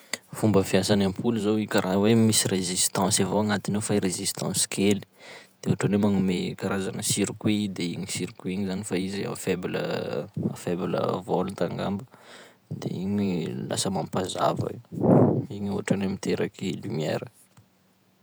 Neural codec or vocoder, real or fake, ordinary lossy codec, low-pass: vocoder, 48 kHz, 128 mel bands, Vocos; fake; none; none